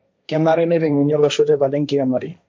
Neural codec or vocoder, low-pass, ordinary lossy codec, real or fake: codec, 16 kHz, 1.1 kbps, Voila-Tokenizer; none; none; fake